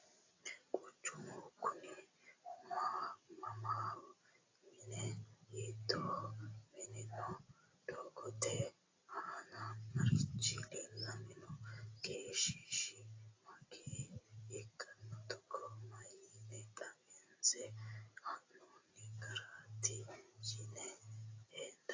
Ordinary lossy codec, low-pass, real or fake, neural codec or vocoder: AAC, 32 kbps; 7.2 kHz; real; none